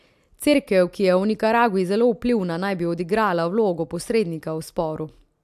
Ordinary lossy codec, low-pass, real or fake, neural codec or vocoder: AAC, 96 kbps; 14.4 kHz; real; none